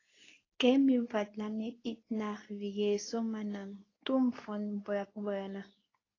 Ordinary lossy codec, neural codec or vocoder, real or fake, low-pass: AAC, 32 kbps; codec, 24 kHz, 0.9 kbps, WavTokenizer, medium speech release version 2; fake; 7.2 kHz